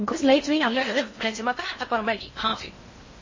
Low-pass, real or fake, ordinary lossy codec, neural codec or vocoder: 7.2 kHz; fake; MP3, 32 kbps; codec, 16 kHz in and 24 kHz out, 0.6 kbps, FocalCodec, streaming, 4096 codes